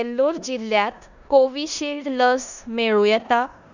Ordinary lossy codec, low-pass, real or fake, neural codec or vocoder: none; 7.2 kHz; fake; codec, 16 kHz in and 24 kHz out, 0.9 kbps, LongCat-Audio-Codec, four codebook decoder